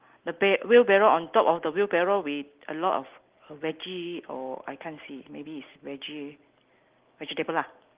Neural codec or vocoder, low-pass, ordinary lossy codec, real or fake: none; 3.6 kHz; Opus, 16 kbps; real